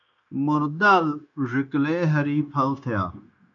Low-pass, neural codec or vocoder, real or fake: 7.2 kHz; codec, 16 kHz, 0.9 kbps, LongCat-Audio-Codec; fake